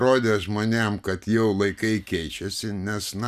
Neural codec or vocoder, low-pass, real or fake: none; 14.4 kHz; real